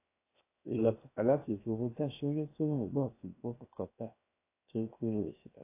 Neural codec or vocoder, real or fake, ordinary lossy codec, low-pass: codec, 16 kHz, 0.7 kbps, FocalCodec; fake; AAC, 24 kbps; 3.6 kHz